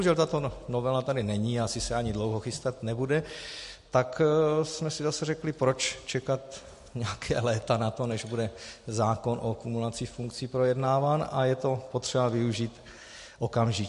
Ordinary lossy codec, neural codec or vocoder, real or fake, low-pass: MP3, 48 kbps; none; real; 14.4 kHz